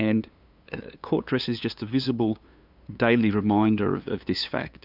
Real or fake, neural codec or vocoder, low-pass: fake; codec, 16 kHz, 2 kbps, FunCodec, trained on LibriTTS, 25 frames a second; 5.4 kHz